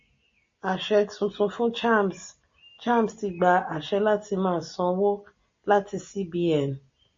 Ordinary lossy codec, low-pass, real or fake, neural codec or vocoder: MP3, 32 kbps; 7.2 kHz; real; none